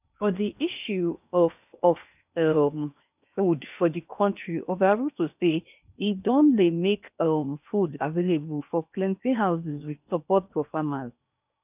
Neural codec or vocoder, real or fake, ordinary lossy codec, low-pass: codec, 16 kHz in and 24 kHz out, 0.8 kbps, FocalCodec, streaming, 65536 codes; fake; none; 3.6 kHz